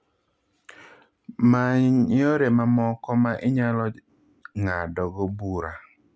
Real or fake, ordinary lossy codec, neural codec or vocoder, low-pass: real; none; none; none